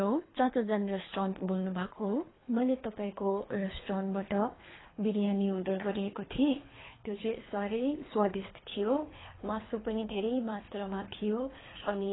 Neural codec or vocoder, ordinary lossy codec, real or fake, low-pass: codec, 24 kHz, 3 kbps, HILCodec; AAC, 16 kbps; fake; 7.2 kHz